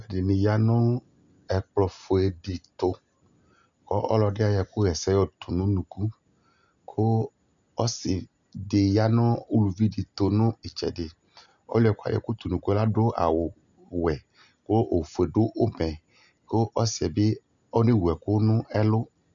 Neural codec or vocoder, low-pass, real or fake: none; 7.2 kHz; real